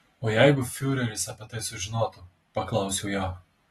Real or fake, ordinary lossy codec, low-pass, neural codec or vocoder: real; AAC, 32 kbps; 19.8 kHz; none